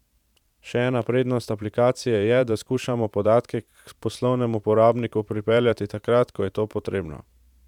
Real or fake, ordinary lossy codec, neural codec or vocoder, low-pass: fake; none; vocoder, 44.1 kHz, 128 mel bands every 512 samples, BigVGAN v2; 19.8 kHz